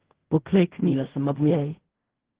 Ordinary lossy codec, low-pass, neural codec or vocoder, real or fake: Opus, 16 kbps; 3.6 kHz; codec, 16 kHz in and 24 kHz out, 0.4 kbps, LongCat-Audio-Codec, fine tuned four codebook decoder; fake